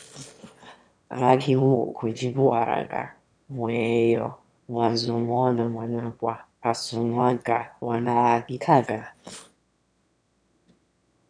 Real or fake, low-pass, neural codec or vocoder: fake; 9.9 kHz; autoencoder, 22.05 kHz, a latent of 192 numbers a frame, VITS, trained on one speaker